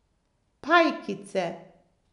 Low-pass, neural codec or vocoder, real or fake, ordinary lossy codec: 10.8 kHz; none; real; none